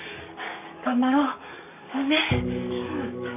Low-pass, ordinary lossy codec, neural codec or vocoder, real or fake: 3.6 kHz; none; codec, 44.1 kHz, 7.8 kbps, DAC; fake